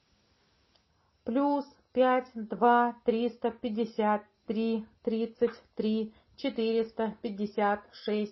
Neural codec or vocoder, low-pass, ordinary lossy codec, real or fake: none; 7.2 kHz; MP3, 24 kbps; real